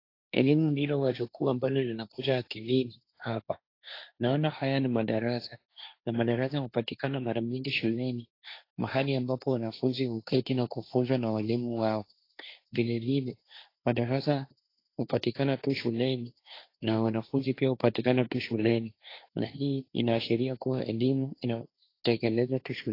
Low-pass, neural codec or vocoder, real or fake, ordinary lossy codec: 5.4 kHz; codec, 16 kHz, 1.1 kbps, Voila-Tokenizer; fake; AAC, 32 kbps